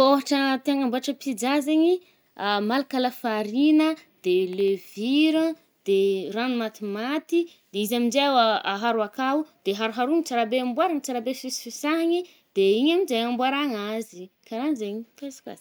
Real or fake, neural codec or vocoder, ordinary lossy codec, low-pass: real; none; none; none